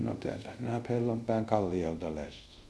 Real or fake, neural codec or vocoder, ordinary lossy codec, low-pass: fake; codec, 24 kHz, 0.5 kbps, DualCodec; none; none